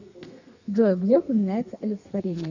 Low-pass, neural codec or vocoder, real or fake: 7.2 kHz; codec, 32 kHz, 1.9 kbps, SNAC; fake